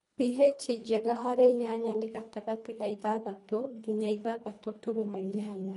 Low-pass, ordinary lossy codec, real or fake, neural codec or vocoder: 10.8 kHz; none; fake; codec, 24 kHz, 1.5 kbps, HILCodec